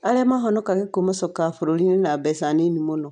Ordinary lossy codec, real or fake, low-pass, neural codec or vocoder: none; real; 9.9 kHz; none